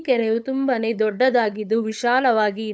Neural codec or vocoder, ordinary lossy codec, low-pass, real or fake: codec, 16 kHz, 8 kbps, FunCodec, trained on LibriTTS, 25 frames a second; none; none; fake